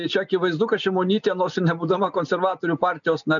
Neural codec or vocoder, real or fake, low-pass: none; real; 7.2 kHz